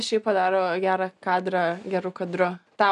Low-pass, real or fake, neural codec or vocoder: 10.8 kHz; real; none